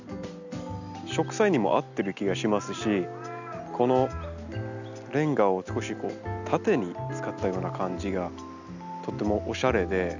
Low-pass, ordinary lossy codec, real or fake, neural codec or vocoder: 7.2 kHz; none; real; none